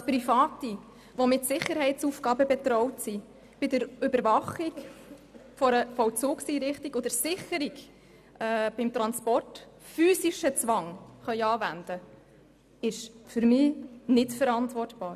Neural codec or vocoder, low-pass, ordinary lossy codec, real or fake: none; 14.4 kHz; none; real